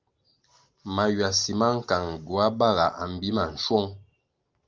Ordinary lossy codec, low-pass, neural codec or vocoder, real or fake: Opus, 32 kbps; 7.2 kHz; none; real